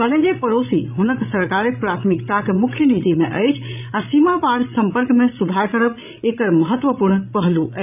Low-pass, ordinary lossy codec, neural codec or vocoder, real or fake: 3.6 kHz; none; codec, 16 kHz, 16 kbps, FreqCodec, larger model; fake